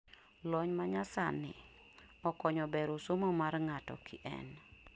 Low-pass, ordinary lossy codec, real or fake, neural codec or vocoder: none; none; real; none